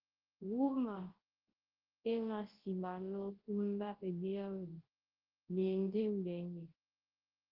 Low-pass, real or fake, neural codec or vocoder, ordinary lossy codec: 5.4 kHz; fake; codec, 24 kHz, 0.9 kbps, WavTokenizer, large speech release; Opus, 16 kbps